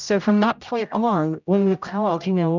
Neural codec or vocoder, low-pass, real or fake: codec, 16 kHz, 0.5 kbps, X-Codec, HuBERT features, trained on general audio; 7.2 kHz; fake